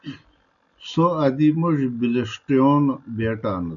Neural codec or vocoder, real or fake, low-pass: none; real; 7.2 kHz